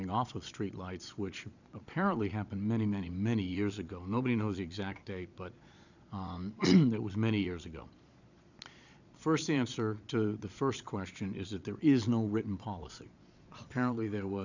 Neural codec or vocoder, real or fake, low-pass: vocoder, 22.05 kHz, 80 mel bands, Vocos; fake; 7.2 kHz